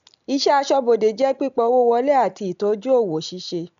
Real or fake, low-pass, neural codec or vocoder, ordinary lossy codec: real; 7.2 kHz; none; none